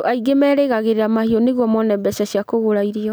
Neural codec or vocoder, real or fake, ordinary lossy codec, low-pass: none; real; none; none